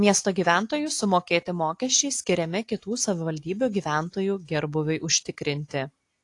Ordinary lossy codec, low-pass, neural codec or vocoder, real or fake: AAC, 48 kbps; 10.8 kHz; none; real